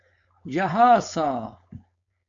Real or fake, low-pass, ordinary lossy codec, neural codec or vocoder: fake; 7.2 kHz; AAC, 64 kbps; codec, 16 kHz, 4.8 kbps, FACodec